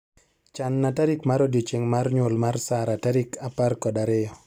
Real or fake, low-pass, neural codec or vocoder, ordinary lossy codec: real; 19.8 kHz; none; none